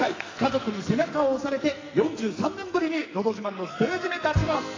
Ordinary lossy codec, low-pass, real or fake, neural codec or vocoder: AAC, 32 kbps; 7.2 kHz; fake; codec, 44.1 kHz, 2.6 kbps, SNAC